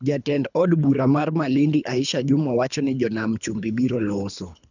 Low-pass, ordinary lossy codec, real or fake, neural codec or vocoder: 7.2 kHz; none; fake; codec, 24 kHz, 3 kbps, HILCodec